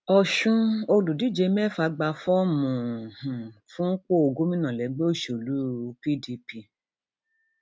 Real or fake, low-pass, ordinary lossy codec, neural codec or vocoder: real; none; none; none